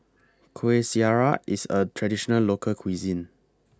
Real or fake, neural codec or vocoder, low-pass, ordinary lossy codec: real; none; none; none